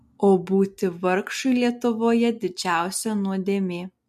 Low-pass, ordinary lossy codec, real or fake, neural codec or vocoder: 14.4 kHz; MP3, 64 kbps; real; none